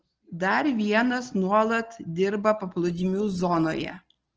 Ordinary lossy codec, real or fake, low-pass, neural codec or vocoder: Opus, 16 kbps; real; 7.2 kHz; none